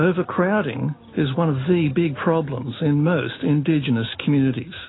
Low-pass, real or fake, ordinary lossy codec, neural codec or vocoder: 7.2 kHz; real; AAC, 16 kbps; none